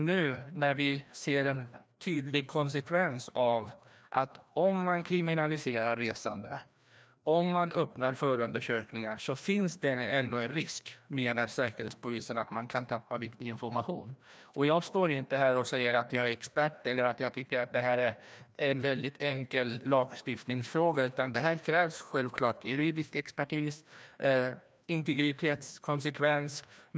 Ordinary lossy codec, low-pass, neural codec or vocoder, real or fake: none; none; codec, 16 kHz, 1 kbps, FreqCodec, larger model; fake